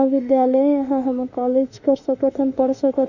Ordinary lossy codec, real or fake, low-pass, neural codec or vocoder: none; fake; 7.2 kHz; autoencoder, 48 kHz, 32 numbers a frame, DAC-VAE, trained on Japanese speech